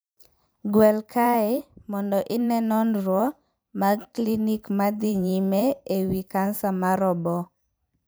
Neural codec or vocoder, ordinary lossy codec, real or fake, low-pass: vocoder, 44.1 kHz, 128 mel bands every 512 samples, BigVGAN v2; none; fake; none